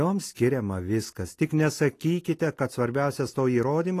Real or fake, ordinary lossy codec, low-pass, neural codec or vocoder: real; AAC, 48 kbps; 14.4 kHz; none